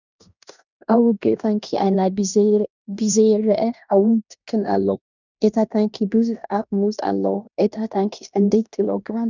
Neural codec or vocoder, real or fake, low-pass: codec, 16 kHz in and 24 kHz out, 0.9 kbps, LongCat-Audio-Codec, fine tuned four codebook decoder; fake; 7.2 kHz